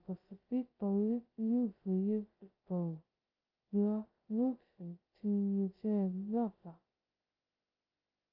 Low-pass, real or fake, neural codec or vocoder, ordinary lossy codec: 5.4 kHz; fake; codec, 16 kHz, 0.2 kbps, FocalCodec; Opus, 24 kbps